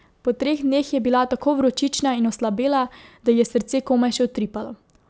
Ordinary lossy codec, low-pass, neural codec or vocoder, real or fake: none; none; none; real